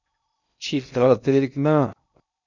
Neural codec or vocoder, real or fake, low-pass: codec, 16 kHz in and 24 kHz out, 0.6 kbps, FocalCodec, streaming, 2048 codes; fake; 7.2 kHz